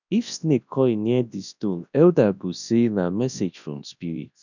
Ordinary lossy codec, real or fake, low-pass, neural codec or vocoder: none; fake; 7.2 kHz; codec, 24 kHz, 0.9 kbps, WavTokenizer, large speech release